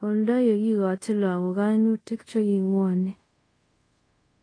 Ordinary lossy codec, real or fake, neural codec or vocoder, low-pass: AAC, 32 kbps; fake; codec, 24 kHz, 0.5 kbps, DualCodec; 9.9 kHz